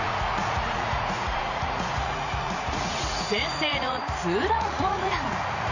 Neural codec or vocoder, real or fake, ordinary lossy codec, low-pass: vocoder, 44.1 kHz, 80 mel bands, Vocos; fake; none; 7.2 kHz